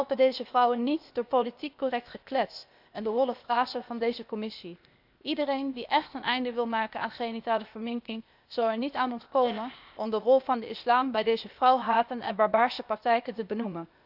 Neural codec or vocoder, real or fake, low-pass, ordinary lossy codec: codec, 16 kHz, 0.8 kbps, ZipCodec; fake; 5.4 kHz; none